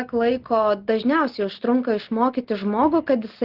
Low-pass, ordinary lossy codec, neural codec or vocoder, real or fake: 5.4 kHz; Opus, 16 kbps; none; real